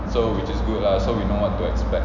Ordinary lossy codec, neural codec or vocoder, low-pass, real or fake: none; none; 7.2 kHz; real